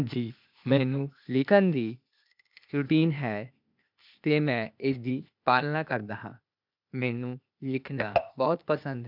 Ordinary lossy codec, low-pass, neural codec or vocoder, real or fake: none; 5.4 kHz; codec, 16 kHz, 0.8 kbps, ZipCodec; fake